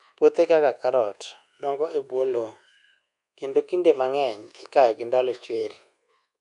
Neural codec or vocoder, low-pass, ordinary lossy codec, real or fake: codec, 24 kHz, 1.2 kbps, DualCodec; 10.8 kHz; MP3, 96 kbps; fake